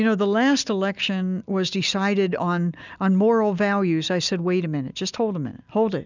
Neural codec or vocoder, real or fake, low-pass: none; real; 7.2 kHz